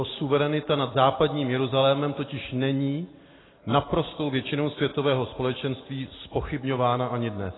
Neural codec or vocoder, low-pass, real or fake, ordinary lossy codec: none; 7.2 kHz; real; AAC, 16 kbps